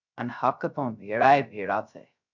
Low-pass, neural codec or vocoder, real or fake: 7.2 kHz; codec, 16 kHz, 0.3 kbps, FocalCodec; fake